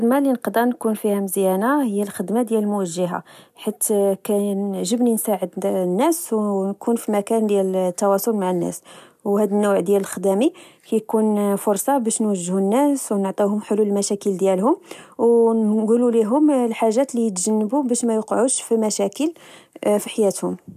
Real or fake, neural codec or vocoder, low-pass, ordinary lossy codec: real; none; 14.4 kHz; none